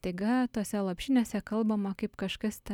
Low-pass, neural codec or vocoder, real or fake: 19.8 kHz; none; real